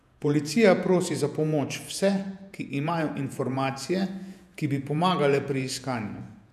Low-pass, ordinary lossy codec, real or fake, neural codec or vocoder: 14.4 kHz; none; fake; vocoder, 48 kHz, 128 mel bands, Vocos